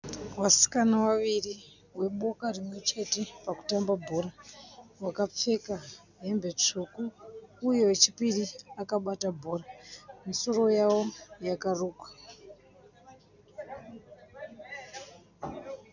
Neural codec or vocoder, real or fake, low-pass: none; real; 7.2 kHz